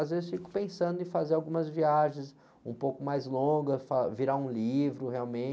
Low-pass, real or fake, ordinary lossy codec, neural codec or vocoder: none; real; none; none